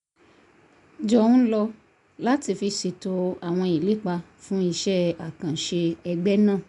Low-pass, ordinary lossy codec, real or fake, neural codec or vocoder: 10.8 kHz; none; real; none